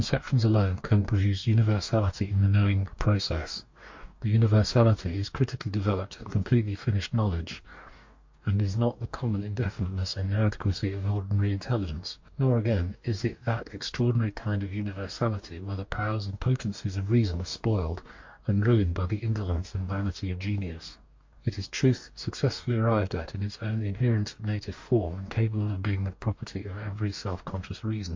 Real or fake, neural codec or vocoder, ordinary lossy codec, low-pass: fake; codec, 44.1 kHz, 2.6 kbps, DAC; MP3, 48 kbps; 7.2 kHz